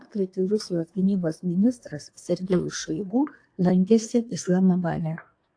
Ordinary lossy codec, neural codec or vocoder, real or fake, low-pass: AAC, 48 kbps; codec, 24 kHz, 1 kbps, SNAC; fake; 9.9 kHz